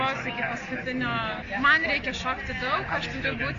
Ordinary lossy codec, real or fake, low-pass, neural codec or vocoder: Opus, 64 kbps; real; 7.2 kHz; none